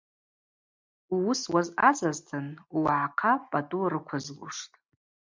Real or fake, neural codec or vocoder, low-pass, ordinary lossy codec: real; none; 7.2 kHz; MP3, 64 kbps